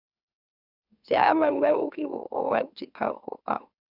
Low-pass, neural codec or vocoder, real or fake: 5.4 kHz; autoencoder, 44.1 kHz, a latent of 192 numbers a frame, MeloTTS; fake